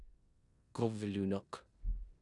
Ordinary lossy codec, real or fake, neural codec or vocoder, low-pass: MP3, 96 kbps; fake; codec, 16 kHz in and 24 kHz out, 0.9 kbps, LongCat-Audio-Codec, four codebook decoder; 10.8 kHz